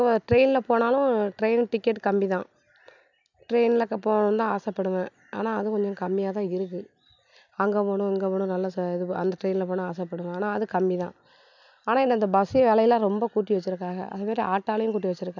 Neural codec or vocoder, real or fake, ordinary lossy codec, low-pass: none; real; none; 7.2 kHz